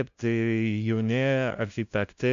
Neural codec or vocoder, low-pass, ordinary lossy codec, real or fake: codec, 16 kHz, 0.5 kbps, FunCodec, trained on LibriTTS, 25 frames a second; 7.2 kHz; MP3, 64 kbps; fake